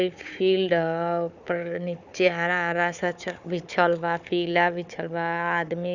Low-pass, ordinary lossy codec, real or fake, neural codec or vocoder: 7.2 kHz; none; fake; codec, 16 kHz, 4 kbps, FunCodec, trained on Chinese and English, 50 frames a second